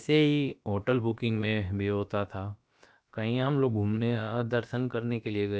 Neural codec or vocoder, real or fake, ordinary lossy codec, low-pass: codec, 16 kHz, about 1 kbps, DyCAST, with the encoder's durations; fake; none; none